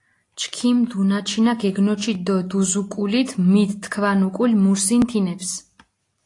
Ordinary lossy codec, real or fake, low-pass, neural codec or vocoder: AAC, 48 kbps; real; 10.8 kHz; none